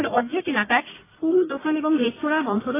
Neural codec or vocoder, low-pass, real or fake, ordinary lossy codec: codec, 44.1 kHz, 1.7 kbps, Pupu-Codec; 3.6 kHz; fake; AAC, 16 kbps